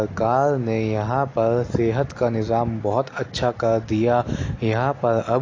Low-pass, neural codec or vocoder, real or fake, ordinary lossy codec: 7.2 kHz; none; real; AAC, 32 kbps